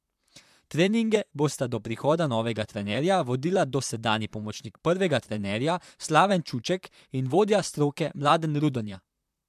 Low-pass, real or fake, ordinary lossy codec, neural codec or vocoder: 14.4 kHz; fake; MP3, 96 kbps; vocoder, 44.1 kHz, 128 mel bands, Pupu-Vocoder